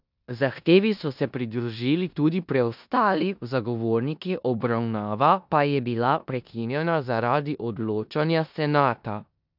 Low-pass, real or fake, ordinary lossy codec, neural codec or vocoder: 5.4 kHz; fake; none; codec, 16 kHz in and 24 kHz out, 0.9 kbps, LongCat-Audio-Codec, four codebook decoder